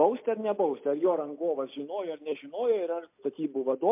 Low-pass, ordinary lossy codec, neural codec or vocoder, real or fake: 3.6 kHz; MP3, 32 kbps; none; real